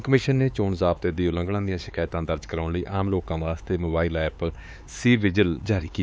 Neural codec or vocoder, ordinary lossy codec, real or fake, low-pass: codec, 16 kHz, 4 kbps, X-Codec, HuBERT features, trained on LibriSpeech; none; fake; none